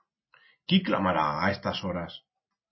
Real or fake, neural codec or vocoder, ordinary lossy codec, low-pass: real; none; MP3, 24 kbps; 7.2 kHz